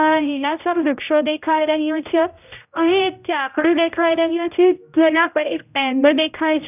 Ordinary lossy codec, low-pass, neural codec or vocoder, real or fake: none; 3.6 kHz; codec, 16 kHz, 0.5 kbps, X-Codec, HuBERT features, trained on general audio; fake